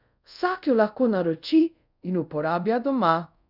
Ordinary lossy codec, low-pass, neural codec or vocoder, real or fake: none; 5.4 kHz; codec, 24 kHz, 0.5 kbps, DualCodec; fake